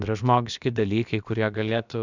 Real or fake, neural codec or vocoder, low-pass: fake; codec, 16 kHz, about 1 kbps, DyCAST, with the encoder's durations; 7.2 kHz